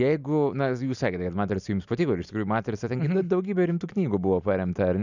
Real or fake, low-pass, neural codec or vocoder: real; 7.2 kHz; none